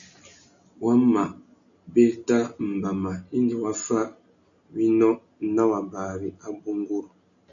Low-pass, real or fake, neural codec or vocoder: 7.2 kHz; real; none